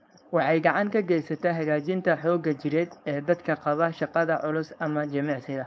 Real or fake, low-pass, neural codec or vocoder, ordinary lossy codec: fake; none; codec, 16 kHz, 4.8 kbps, FACodec; none